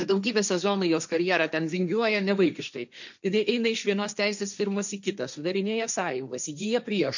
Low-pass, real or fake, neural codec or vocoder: 7.2 kHz; fake; codec, 16 kHz, 1.1 kbps, Voila-Tokenizer